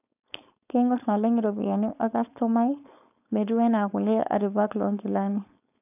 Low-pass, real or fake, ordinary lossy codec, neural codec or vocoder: 3.6 kHz; fake; none; codec, 16 kHz, 4.8 kbps, FACodec